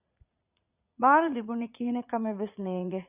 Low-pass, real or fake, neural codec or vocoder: 3.6 kHz; fake; vocoder, 44.1 kHz, 80 mel bands, Vocos